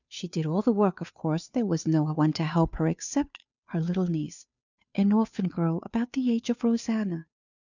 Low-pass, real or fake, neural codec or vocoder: 7.2 kHz; fake; codec, 16 kHz, 2 kbps, FunCodec, trained on Chinese and English, 25 frames a second